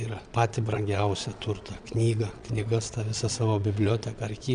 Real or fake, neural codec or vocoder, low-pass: fake; vocoder, 22.05 kHz, 80 mel bands, Vocos; 9.9 kHz